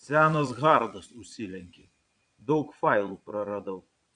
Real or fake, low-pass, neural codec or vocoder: fake; 9.9 kHz; vocoder, 22.05 kHz, 80 mel bands, Vocos